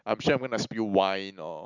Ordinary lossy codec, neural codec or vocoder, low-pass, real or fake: none; none; 7.2 kHz; real